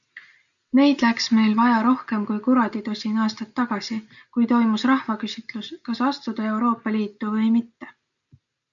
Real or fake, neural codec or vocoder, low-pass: real; none; 7.2 kHz